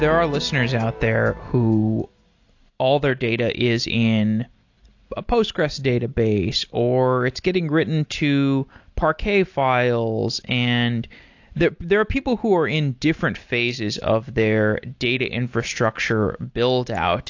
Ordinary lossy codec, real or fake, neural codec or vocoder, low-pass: AAC, 48 kbps; real; none; 7.2 kHz